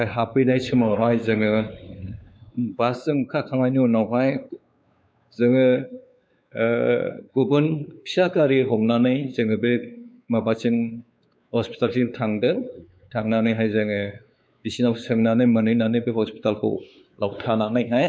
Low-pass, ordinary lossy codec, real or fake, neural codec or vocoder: none; none; fake; codec, 16 kHz, 4 kbps, X-Codec, WavLM features, trained on Multilingual LibriSpeech